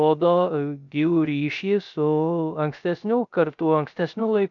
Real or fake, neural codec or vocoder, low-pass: fake; codec, 16 kHz, 0.3 kbps, FocalCodec; 7.2 kHz